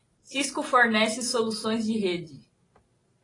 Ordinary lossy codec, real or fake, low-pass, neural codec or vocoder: AAC, 32 kbps; real; 10.8 kHz; none